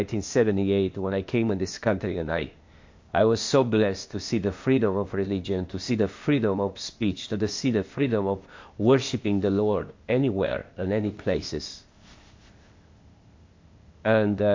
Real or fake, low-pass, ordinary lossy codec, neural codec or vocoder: fake; 7.2 kHz; MP3, 48 kbps; codec, 16 kHz, 0.8 kbps, ZipCodec